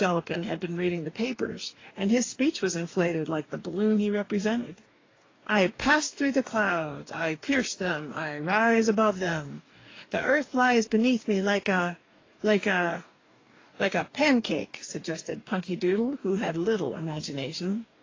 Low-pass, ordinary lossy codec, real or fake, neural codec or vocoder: 7.2 kHz; AAC, 32 kbps; fake; codec, 44.1 kHz, 2.6 kbps, DAC